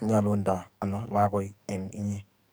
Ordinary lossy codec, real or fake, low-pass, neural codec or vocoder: none; fake; none; codec, 44.1 kHz, 2.6 kbps, SNAC